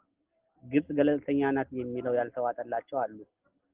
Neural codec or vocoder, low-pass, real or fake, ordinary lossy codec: none; 3.6 kHz; real; Opus, 16 kbps